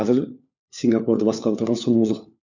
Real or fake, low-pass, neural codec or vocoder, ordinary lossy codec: fake; 7.2 kHz; codec, 16 kHz, 4 kbps, FunCodec, trained on LibriTTS, 50 frames a second; none